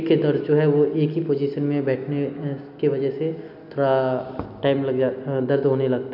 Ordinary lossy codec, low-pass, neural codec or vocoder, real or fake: none; 5.4 kHz; none; real